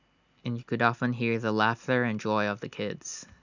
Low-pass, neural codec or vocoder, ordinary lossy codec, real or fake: 7.2 kHz; none; none; real